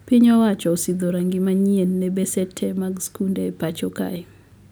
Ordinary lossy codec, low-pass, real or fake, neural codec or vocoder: none; none; real; none